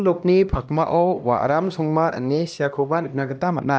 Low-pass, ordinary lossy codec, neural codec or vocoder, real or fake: none; none; codec, 16 kHz, 1 kbps, X-Codec, HuBERT features, trained on LibriSpeech; fake